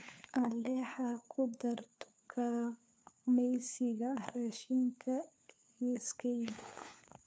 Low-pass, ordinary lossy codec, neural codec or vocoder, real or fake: none; none; codec, 16 kHz, 4 kbps, FunCodec, trained on LibriTTS, 50 frames a second; fake